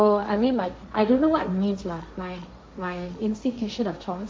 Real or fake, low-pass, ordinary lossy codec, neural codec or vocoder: fake; none; none; codec, 16 kHz, 1.1 kbps, Voila-Tokenizer